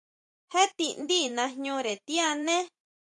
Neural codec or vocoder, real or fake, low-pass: vocoder, 44.1 kHz, 128 mel bands every 512 samples, BigVGAN v2; fake; 10.8 kHz